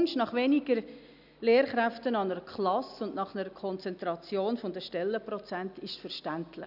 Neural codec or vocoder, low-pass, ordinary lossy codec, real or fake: none; 5.4 kHz; none; real